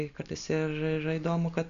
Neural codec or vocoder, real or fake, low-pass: none; real; 7.2 kHz